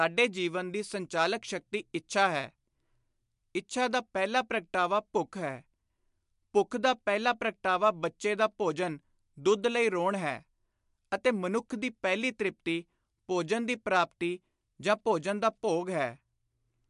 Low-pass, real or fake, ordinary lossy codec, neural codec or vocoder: 10.8 kHz; real; MP3, 64 kbps; none